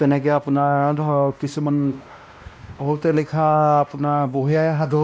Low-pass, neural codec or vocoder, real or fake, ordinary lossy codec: none; codec, 16 kHz, 1 kbps, X-Codec, WavLM features, trained on Multilingual LibriSpeech; fake; none